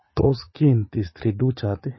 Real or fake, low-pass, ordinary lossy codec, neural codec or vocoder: fake; 7.2 kHz; MP3, 24 kbps; vocoder, 44.1 kHz, 80 mel bands, Vocos